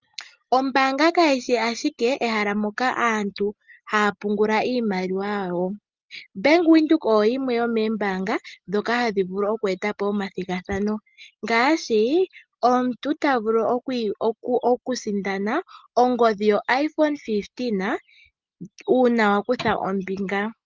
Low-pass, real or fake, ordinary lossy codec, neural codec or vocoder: 7.2 kHz; real; Opus, 32 kbps; none